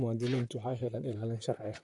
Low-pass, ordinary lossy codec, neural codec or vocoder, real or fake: 10.8 kHz; Opus, 64 kbps; vocoder, 44.1 kHz, 128 mel bands, Pupu-Vocoder; fake